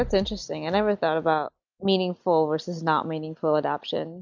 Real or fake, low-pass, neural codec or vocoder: real; 7.2 kHz; none